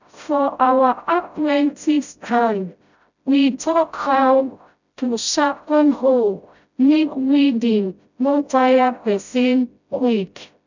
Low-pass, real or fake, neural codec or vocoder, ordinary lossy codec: 7.2 kHz; fake; codec, 16 kHz, 0.5 kbps, FreqCodec, smaller model; none